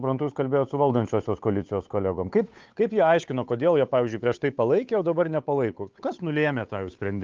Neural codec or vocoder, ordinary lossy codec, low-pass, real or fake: codec, 16 kHz, 8 kbps, FunCodec, trained on Chinese and English, 25 frames a second; Opus, 24 kbps; 7.2 kHz; fake